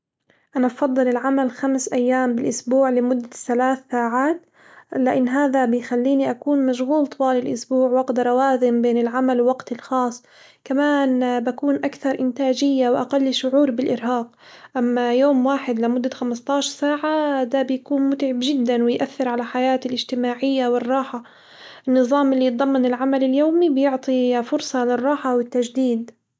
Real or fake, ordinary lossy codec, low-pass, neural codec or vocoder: real; none; none; none